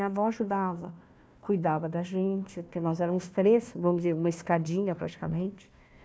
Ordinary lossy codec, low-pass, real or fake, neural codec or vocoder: none; none; fake; codec, 16 kHz, 1 kbps, FunCodec, trained on Chinese and English, 50 frames a second